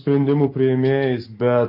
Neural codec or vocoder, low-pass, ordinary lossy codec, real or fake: none; 5.4 kHz; MP3, 32 kbps; real